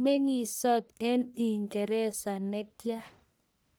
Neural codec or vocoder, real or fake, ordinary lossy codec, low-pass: codec, 44.1 kHz, 1.7 kbps, Pupu-Codec; fake; none; none